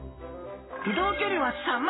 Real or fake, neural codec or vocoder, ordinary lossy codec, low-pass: real; none; AAC, 16 kbps; 7.2 kHz